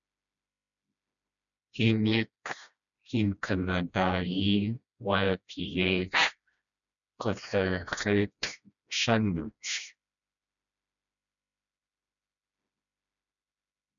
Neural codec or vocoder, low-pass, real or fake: codec, 16 kHz, 1 kbps, FreqCodec, smaller model; 7.2 kHz; fake